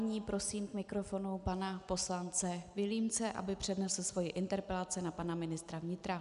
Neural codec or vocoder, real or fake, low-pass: none; real; 10.8 kHz